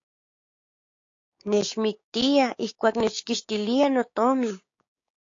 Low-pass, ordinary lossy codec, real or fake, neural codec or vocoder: 7.2 kHz; AAC, 48 kbps; fake; codec, 16 kHz, 6 kbps, DAC